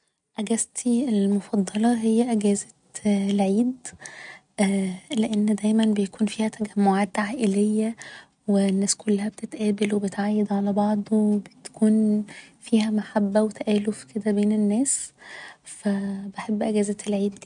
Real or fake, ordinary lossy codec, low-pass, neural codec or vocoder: real; none; 9.9 kHz; none